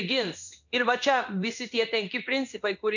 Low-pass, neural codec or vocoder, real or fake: 7.2 kHz; codec, 16 kHz in and 24 kHz out, 1 kbps, XY-Tokenizer; fake